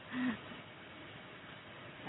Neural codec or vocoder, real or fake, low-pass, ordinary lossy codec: none; real; 7.2 kHz; AAC, 16 kbps